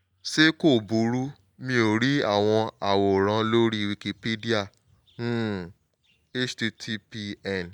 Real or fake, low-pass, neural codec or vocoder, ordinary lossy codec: real; 19.8 kHz; none; none